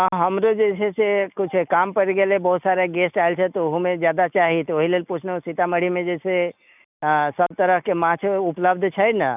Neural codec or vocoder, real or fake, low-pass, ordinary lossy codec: none; real; 3.6 kHz; none